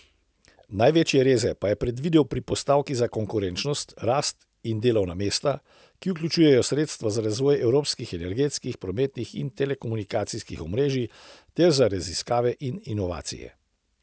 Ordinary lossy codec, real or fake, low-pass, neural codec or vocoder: none; real; none; none